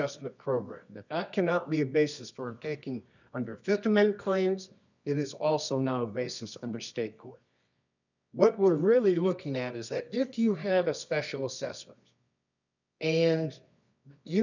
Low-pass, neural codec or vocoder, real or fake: 7.2 kHz; codec, 24 kHz, 0.9 kbps, WavTokenizer, medium music audio release; fake